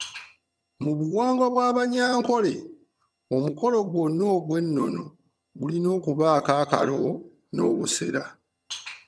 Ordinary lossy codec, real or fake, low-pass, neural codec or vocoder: none; fake; none; vocoder, 22.05 kHz, 80 mel bands, HiFi-GAN